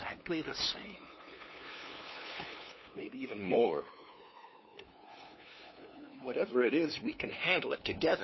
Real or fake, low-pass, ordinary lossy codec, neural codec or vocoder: fake; 7.2 kHz; MP3, 24 kbps; codec, 16 kHz, 2 kbps, FunCodec, trained on LibriTTS, 25 frames a second